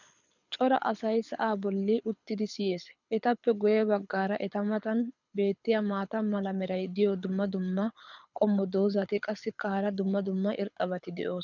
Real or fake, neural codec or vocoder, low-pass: fake; codec, 24 kHz, 6 kbps, HILCodec; 7.2 kHz